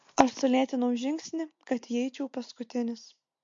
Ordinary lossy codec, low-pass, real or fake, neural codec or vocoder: MP3, 48 kbps; 7.2 kHz; real; none